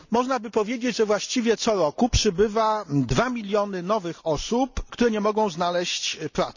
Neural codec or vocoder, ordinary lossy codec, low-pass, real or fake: none; none; 7.2 kHz; real